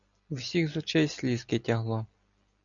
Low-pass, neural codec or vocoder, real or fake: 7.2 kHz; none; real